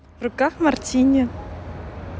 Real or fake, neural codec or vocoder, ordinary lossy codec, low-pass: real; none; none; none